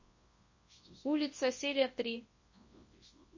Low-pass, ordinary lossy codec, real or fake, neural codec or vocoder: 7.2 kHz; MP3, 32 kbps; fake; codec, 24 kHz, 0.9 kbps, WavTokenizer, large speech release